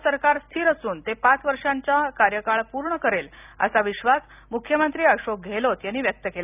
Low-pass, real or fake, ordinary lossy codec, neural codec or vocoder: 3.6 kHz; real; none; none